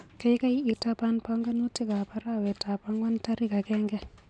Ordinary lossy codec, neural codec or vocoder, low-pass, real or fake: MP3, 96 kbps; none; 9.9 kHz; real